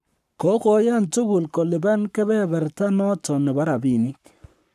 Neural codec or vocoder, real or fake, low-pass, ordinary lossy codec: codec, 44.1 kHz, 7.8 kbps, Pupu-Codec; fake; 14.4 kHz; none